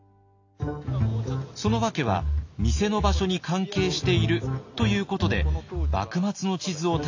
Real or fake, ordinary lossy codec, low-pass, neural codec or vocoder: real; AAC, 48 kbps; 7.2 kHz; none